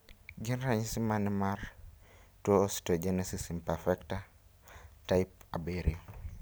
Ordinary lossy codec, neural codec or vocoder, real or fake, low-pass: none; none; real; none